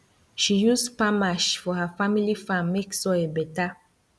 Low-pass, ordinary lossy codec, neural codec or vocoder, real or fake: none; none; none; real